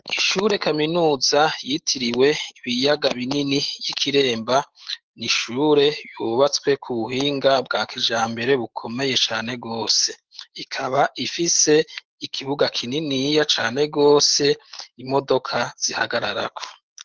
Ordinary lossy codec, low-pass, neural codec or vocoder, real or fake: Opus, 16 kbps; 7.2 kHz; autoencoder, 48 kHz, 128 numbers a frame, DAC-VAE, trained on Japanese speech; fake